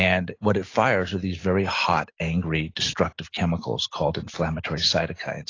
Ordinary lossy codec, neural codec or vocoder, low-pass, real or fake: AAC, 32 kbps; none; 7.2 kHz; real